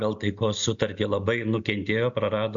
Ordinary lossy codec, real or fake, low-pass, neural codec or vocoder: AAC, 64 kbps; real; 7.2 kHz; none